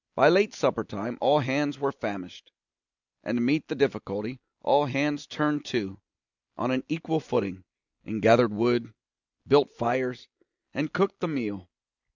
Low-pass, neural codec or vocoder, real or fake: 7.2 kHz; none; real